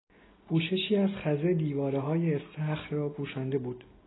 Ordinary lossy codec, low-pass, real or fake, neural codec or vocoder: AAC, 16 kbps; 7.2 kHz; real; none